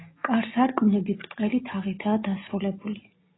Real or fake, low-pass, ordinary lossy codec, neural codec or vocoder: real; 7.2 kHz; AAC, 16 kbps; none